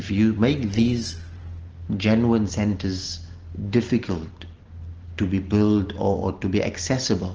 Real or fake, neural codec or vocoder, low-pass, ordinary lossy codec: real; none; 7.2 kHz; Opus, 16 kbps